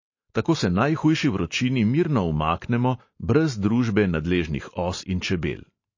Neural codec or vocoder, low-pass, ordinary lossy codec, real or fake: none; 7.2 kHz; MP3, 32 kbps; real